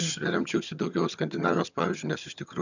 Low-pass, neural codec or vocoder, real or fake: 7.2 kHz; vocoder, 22.05 kHz, 80 mel bands, HiFi-GAN; fake